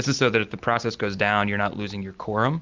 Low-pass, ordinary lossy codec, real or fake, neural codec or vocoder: 7.2 kHz; Opus, 24 kbps; real; none